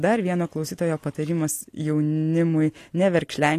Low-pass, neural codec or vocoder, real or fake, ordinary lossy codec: 14.4 kHz; none; real; AAC, 48 kbps